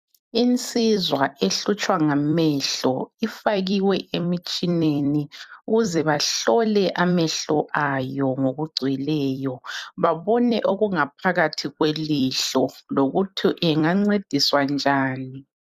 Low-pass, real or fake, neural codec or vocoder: 14.4 kHz; fake; vocoder, 44.1 kHz, 128 mel bands every 256 samples, BigVGAN v2